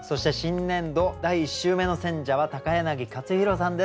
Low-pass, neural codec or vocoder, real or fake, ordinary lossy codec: none; none; real; none